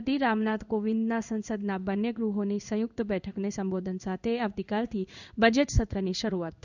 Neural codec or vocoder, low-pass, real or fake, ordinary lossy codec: codec, 16 kHz in and 24 kHz out, 1 kbps, XY-Tokenizer; 7.2 kHz; fake; none